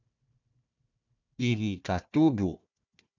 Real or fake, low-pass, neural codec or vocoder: fake; 7.2 kHz; codec, 16 kHz, 1 kbps, FunCodec, trained on Chinese and English, 50 frames a second